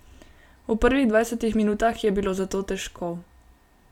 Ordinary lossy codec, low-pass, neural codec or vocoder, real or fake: none; 19.8 kHz; none; real